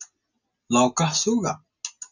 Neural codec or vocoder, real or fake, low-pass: none; real; 7.2 kHz